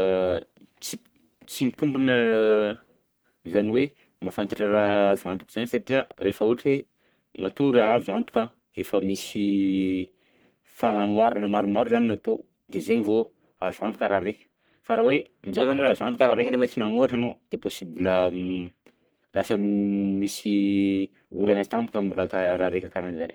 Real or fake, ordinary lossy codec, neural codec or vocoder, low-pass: fake; none; codec, 44.1 kHz, 1.7 kbps, Pupu-Codec; none